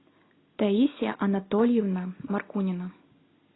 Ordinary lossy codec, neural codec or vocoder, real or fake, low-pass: AAC, 16 kbps; none; real; 7.2 kHz